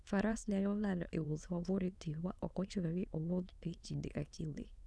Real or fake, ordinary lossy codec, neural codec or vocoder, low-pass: fake; none; autoencoder, 22.05 kHz, a latent of 192 numbers a frame, VITS, trained on many speakers; none